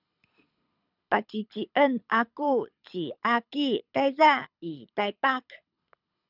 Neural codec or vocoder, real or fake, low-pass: codec, 24 kHz, 6 kbps, HILCodec; fake; 5.4 kHz